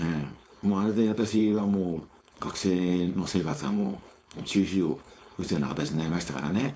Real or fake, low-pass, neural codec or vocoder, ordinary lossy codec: fake; none; codec, 16 kHz, 4.8 kbps, FACodec; none